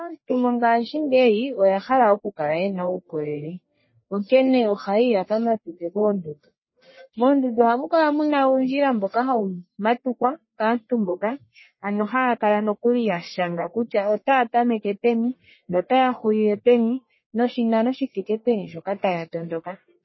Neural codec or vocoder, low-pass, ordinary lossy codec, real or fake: codec, 44.1 kHz, 1.7 kbps, Pupu-Codec; 7.2 kHz; MP3, 24 kbps; fake